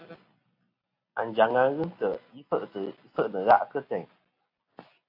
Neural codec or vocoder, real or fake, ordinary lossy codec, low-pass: none; real; AAC, 32 kbps; 5.4 kHz